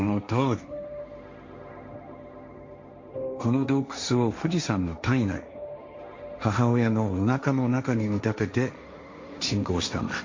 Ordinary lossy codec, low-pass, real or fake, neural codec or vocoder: MP3, 48 kbps; 7.2 kHz; fake; codec, 16 kHz, 1.1 kbps, Voila-Tokenizer